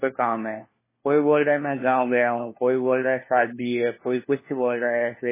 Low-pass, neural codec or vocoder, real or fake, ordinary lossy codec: 3.6 kHz; codec, 16 kHz, 1 kbps, FunCodec, trained on LibriTTS, 50 frames a second; fake; MP3, 16 kbps